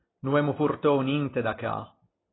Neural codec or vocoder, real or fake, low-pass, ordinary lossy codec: none; real; 7.2 kHz; AAC, 16 kbps